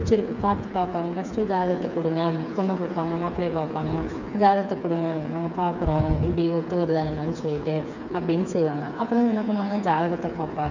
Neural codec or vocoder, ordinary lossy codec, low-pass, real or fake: codec, 16 kHz, 4 kbps, FreqCodec, smaller model; none; 7.2 kHz; fake